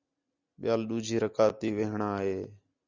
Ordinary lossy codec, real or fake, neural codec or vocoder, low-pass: Opus, 64 kbps; real; none; 7.2 kHz